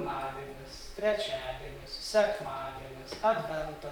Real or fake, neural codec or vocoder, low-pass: fake; vocoder, 44.1 kHz, 128 mel bands, Pupu-Vocoder; 19.8 kHz